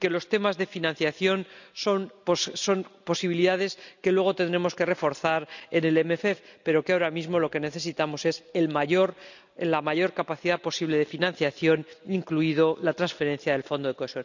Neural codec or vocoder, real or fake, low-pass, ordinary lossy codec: none; real; 7.2 kHz; none